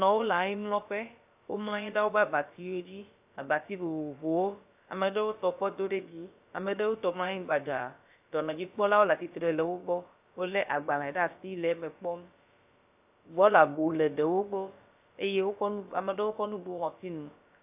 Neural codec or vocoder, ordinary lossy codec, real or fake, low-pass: codec, 16 kHz, about 1 kbps, DyCAST, with the encoder's durations; AAC, 32 kbps; fake; 3.6 kHz